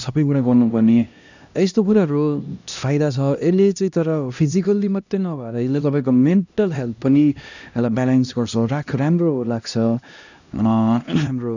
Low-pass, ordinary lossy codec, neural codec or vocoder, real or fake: 7.2 kHz; none; codec, 16 kHz, 1 kbps, X-Codec, HuBERT features, trained on LibriSpeech; fake